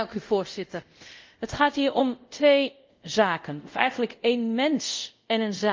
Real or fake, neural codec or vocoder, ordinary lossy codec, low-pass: fake; codec, 24 kHz, 0.5 kbps, DualCodec; Opus, 24 kbps; 7.2 kHz